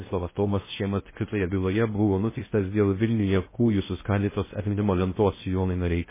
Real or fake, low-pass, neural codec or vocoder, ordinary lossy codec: fake; 3.6 kHz; codec, 16 kHz in and 24 kHz out, 0.6 kbps, FocalCodec, streaming, 2048 codes; MP3, 16 kbps